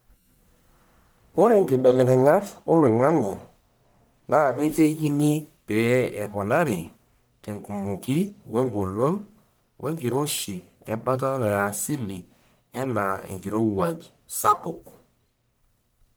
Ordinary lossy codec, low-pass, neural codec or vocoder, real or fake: none; none; codec, 44.1 kHz, 1.7 kbps, Pupu-Codec; fake